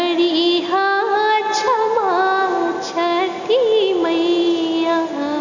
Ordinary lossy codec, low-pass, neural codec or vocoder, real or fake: none; 7.2 kHz; none; real